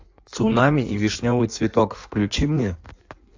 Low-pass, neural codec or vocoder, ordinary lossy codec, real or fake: 7.2 kHz; codec, 16 kHz in and 24 kHz out, 1.1 kbps, FireRedTTS-2 codec; AAC, 48 kbps; fake